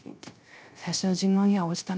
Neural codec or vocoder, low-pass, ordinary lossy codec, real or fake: codec, 16 kHz, 0.3 kbps, FocalCodec; none; none; fake